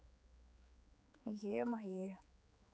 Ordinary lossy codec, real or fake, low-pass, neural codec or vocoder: none; fake; none; codec, 16 kHz, 4 kbps, X-Codec, HuBERT features, trained on general audio